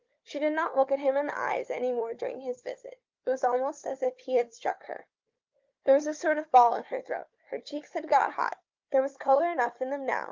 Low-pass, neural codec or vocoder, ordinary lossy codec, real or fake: 7.2 kHz; codec, 16 kHz, 4 kbps, FunCodec, trained on Chinese and English, 50 frames a second; Opus, 24 kbps; fake